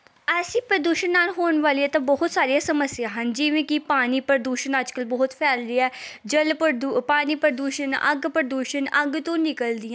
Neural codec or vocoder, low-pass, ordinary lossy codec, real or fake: none; none; none; real